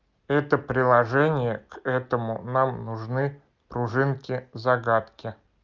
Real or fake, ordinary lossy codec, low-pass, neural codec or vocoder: real; Opus, 32 kbps; 7.2 kHz; none